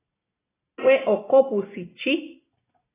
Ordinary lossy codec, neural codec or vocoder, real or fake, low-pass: AAC, 16 kbps; none; real; 3.6 kHz